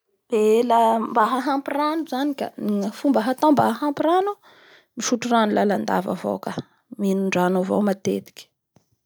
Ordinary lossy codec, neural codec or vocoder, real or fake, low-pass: none; none; real; none